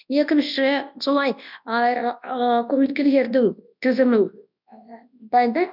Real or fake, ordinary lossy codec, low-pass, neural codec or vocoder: fake; none; 5.4 kHz; codec, 24 kHz, 0.9 kbps, WavTokenizer, large speech release